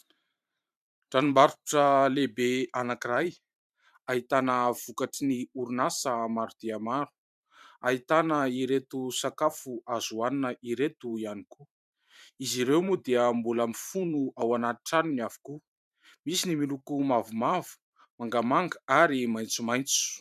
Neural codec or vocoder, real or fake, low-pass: vocoder, 44.1 kHz, 128 mel bands every 512 samples, BigVGAN v2; fake; 14.4 kHz